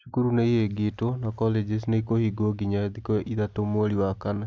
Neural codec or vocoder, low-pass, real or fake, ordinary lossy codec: none; none; real; none